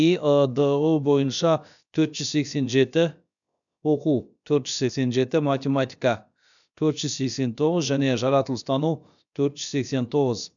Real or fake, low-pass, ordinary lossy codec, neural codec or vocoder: fake; 7.2 kHz; none; codec, 16 kHz, about 1 kbps, DyCAST, with the encoder's durations